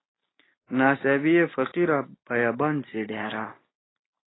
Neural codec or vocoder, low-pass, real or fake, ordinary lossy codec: none; 7.2 kHz; real; AAC, 16 kbps